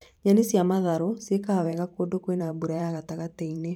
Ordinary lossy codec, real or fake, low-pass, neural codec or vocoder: none; fake; 19.8 kHz; vocoder, 44.1 kHz, 128 mel bands every 512 samples, BigVGAN v2